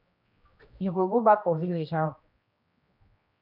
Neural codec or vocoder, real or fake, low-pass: codec, 16 kHz, 1 kbps, X-Codec, HuBERT features, trained on general audio; fake; 5.4 kHz